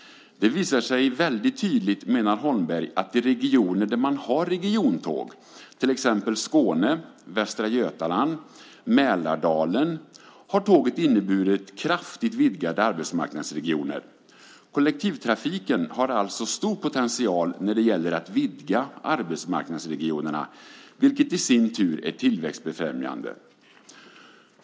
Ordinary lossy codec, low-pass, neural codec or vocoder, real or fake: none; none; none; real